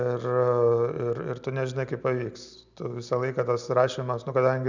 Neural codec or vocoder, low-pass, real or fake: none; 7.2 kHz; real